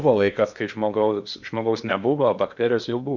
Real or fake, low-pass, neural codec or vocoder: fake; 7.2 kHz; codec, 16 kHz in and 24 kHz out, 0.8 kbps, FocalCodec, streaming, 65536 codes